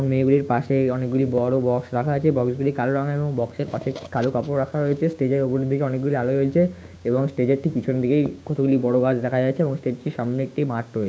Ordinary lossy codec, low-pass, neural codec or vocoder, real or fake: none; none; codec, 16 kHz, 6 kbps, DAC; fake